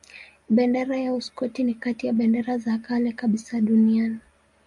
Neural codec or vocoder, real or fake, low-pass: none; real; 10.8 kHz